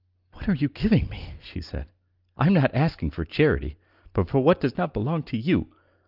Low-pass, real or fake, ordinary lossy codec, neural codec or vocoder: 5.4 kHz; real; Opus, 32 kbps; none